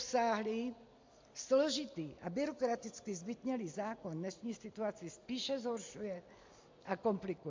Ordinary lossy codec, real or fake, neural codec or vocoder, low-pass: MP3, 48 kbps; real; none; 7.2 kHz